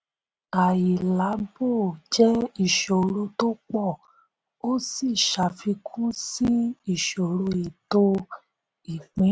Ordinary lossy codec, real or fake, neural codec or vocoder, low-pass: none; real; none; none